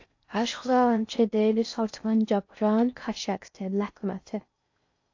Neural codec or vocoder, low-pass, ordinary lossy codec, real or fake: codec, 16 kHz in and 24 kHz out, 0.6 kbps, FocalCodec, streaming, 4096 codes; 7.2 kHz; AAC, 48 kbps; fake